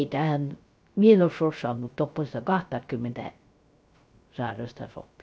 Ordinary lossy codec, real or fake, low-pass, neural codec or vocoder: none; fake; none; codec, 16 kHz, 0.3 kbps, FocalCodec